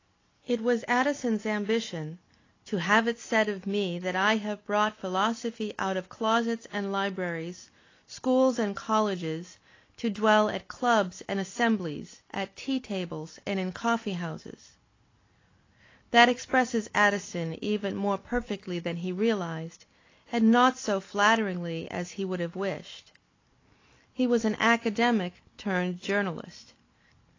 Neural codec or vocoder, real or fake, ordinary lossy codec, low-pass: none; real; AAC, 32 kbps; 7.2 kHz